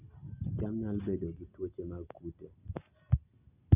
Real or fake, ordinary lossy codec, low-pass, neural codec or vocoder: real; none; 3.6 kHz; none